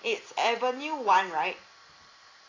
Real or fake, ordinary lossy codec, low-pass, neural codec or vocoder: real; AAC, 32 kbps; 7.2 kHz; none